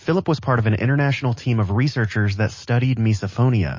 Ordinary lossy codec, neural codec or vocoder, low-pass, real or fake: MP3, 32 kbps; none; 7.2 kHz; real